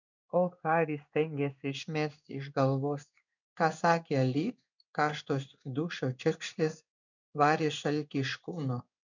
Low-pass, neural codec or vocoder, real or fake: 7.2 kHz; codec, 16 kHz in and 24 kHz out, 1 kbps, XY-Tokenizer; fake